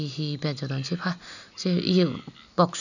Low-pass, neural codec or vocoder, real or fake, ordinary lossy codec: 7.2 kHz; none; real; none